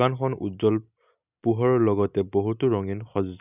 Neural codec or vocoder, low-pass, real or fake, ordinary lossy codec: none; 3.6 kHz; real; none